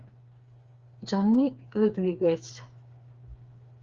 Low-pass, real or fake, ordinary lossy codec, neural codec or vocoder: 7.2 kHz; fake; Opus, 32 kbps; codec, 16 kHz, 4 kbps, FreqCodec, smaller model